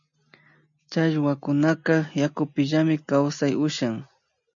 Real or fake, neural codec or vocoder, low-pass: real; none; 7.2 kHz